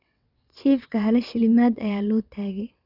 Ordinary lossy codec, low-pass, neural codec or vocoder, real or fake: none; 5.4 kHz; vocoder, 22.05 kHz, 80 mel bands, WaveNeXt; fake